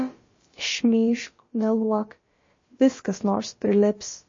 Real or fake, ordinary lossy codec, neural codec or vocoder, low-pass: fake; MP3, 32 kbps; codec, 16 kHz, about 1 kbps, DyCAST, with the encoder's durations; 7.2 kHz